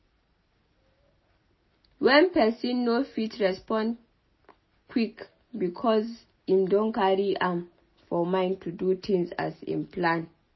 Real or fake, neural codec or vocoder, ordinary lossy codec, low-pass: real; none; MP3, 24 kbps; 7.2 kHz